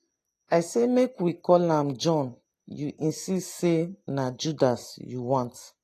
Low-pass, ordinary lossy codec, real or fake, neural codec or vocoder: 14.4 kHz; AAC, 48 kbps; real; none